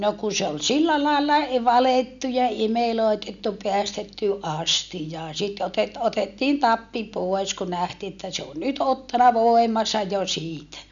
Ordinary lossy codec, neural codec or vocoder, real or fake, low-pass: none; none; real; 7.2 kHz